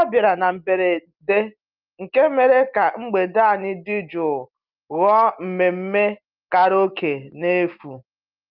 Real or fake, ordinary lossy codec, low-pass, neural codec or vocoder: real; Opus, 24 kbps; 5.4 kHz; none